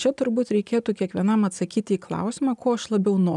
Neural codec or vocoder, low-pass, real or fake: none; 10.8 kHz; real